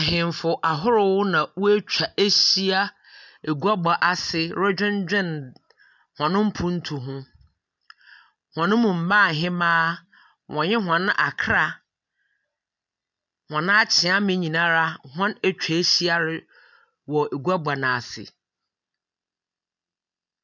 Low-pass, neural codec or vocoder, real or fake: 7.2 kHz; none; real